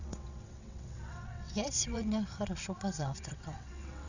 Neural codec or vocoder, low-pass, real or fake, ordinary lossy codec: vocoder, 22.05 kHz, 80 mel bands, WaveNeXt; 7.2 kHz; fake; none